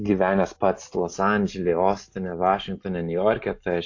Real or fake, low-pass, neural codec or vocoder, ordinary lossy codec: real; 7.2 kHz; none; AAC, 48 kbps